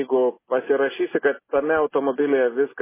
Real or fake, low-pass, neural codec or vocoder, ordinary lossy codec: real; 3.6 kHz; none; MP3, 16 kbps